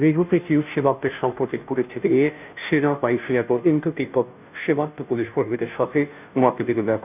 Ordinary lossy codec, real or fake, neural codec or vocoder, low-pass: none; fake; codec, 16 kHz, 0.5 kbps, FunCodec, trained on Chinese and English, 25 frames a second; 3.6 kHz